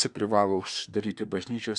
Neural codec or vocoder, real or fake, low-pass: codec, 24 kHz, 1 kbps, SNAC; fake; 10.8 kHz